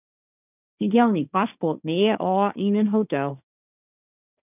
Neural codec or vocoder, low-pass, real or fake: codec, 16 kHz, 1.1 kbps, Voila-Tokenizer; 3.6 kHz; fake